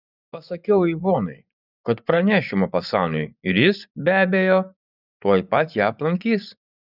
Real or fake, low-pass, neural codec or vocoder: fake; 5.4 kHz; vocoder, 24 kHz, 100 mel bands, Vocos